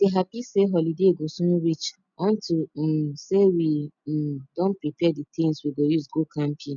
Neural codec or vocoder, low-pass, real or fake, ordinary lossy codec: none; 7.2 kHz; real; none